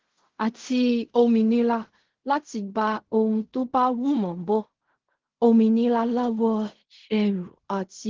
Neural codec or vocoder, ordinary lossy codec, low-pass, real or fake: codec, 16 kHz in and 24 kHz out, 0.4 kbps, LongCat-Audio-Codec, fine tuned four codebook decoder; Opus, 16 kbps; 7.2 kHz; fake